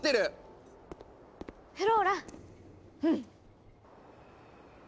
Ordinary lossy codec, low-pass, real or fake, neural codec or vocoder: none; none; real; none